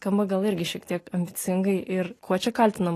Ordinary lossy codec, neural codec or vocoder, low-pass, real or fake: AAC, 48 kbps; none; 14.4 kHz; real